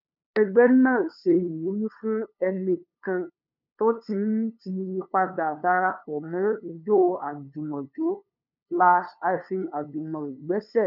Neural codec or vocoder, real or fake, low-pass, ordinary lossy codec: codec, 16 kHz, 2 kbps, FunCodec, trained on LibriTTS, 25 frames a second; fake; 5.4 kHz; none